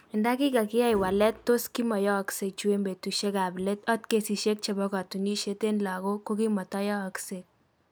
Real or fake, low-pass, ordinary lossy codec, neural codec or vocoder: real; none; none; none